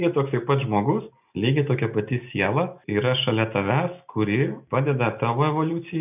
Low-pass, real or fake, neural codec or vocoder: 3.6 kHz; real; none